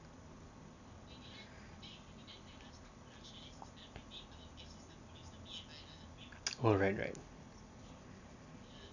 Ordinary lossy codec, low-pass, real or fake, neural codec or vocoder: none; 7.2 kHz; real; none